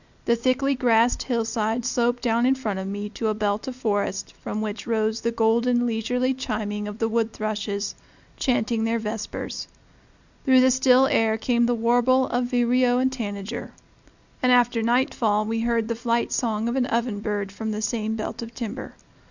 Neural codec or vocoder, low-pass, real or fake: none; 7.2 kHz; real